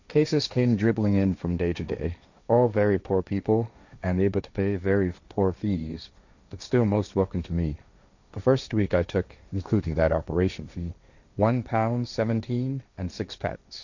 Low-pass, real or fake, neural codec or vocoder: 7.2 kHz; fake; codec, 16 kHz, 1.1 kbps, Voila-Tokenizer